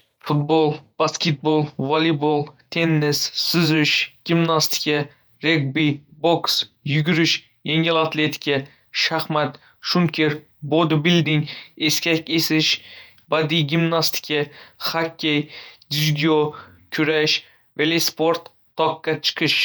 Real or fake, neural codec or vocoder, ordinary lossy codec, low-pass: fake; vocoder, 48 kHz, 128 mel bands, Vocos; none; none